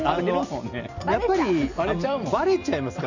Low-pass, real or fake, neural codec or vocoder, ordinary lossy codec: 7.2 kHz; real; none; none